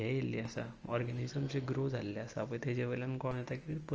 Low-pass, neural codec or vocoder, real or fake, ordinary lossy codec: 7.2 kHz; none; real; Opus, 24 kbps